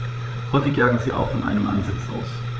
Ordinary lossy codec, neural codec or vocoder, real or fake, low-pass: none; codec, 16 kHz, 8 kbps, FreqCodec, larger model; fake; none